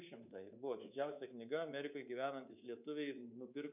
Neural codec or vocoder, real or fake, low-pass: codec, 24 kHz, 3.1 kbps, DualCodec; fake; 3.6 kHz